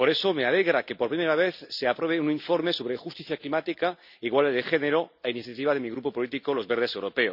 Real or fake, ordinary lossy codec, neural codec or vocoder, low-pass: real; none; none; 5.4 kHz